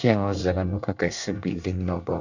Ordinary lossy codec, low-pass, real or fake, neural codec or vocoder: none; 7.2 kHz; fake; codec, 24 kHz, 1 kbps, SNAC